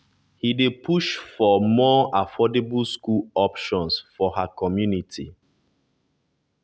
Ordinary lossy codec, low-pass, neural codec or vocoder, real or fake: none; none; none; real